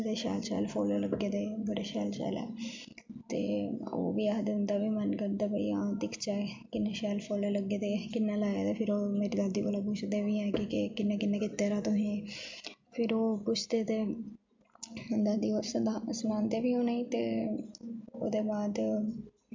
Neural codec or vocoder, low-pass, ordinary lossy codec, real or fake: none; 7.2 kHz; MP3, 64 kbps; real